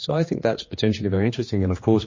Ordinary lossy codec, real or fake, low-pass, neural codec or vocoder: MP3, 32 kbps; fake; 7.2 kHz; codec, 16 kHz, 2 kbps, X-Codec, HuBERT features, trained on general audio